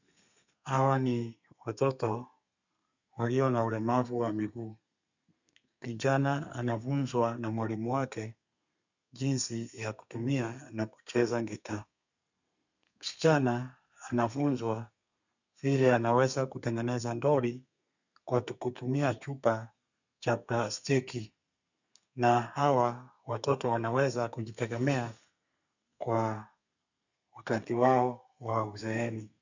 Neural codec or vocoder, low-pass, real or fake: codec, 32 kHz, 1.9 kbps, SNAC; 7.2 kHz; fake